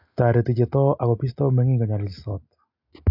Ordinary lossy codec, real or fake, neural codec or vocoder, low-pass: AAC, 48 kbps; real; none; 5.4 kHz